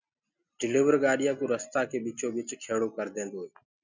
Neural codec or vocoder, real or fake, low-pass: none; real; 7.2 kHz